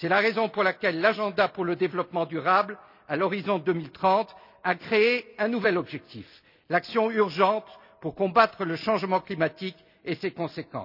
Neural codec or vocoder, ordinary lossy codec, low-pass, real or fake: none; none; 5.4 kHz; real